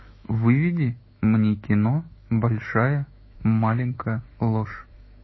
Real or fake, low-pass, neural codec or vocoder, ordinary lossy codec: fake; 7.2 kHz; autoencoder, 48 kHz, 128 numbers a frame, DAC-VAE, trained on Japanese speech; MP3, 24 kbps